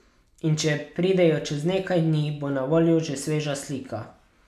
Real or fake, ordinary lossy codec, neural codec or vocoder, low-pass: real; none; none; 14.4 kHz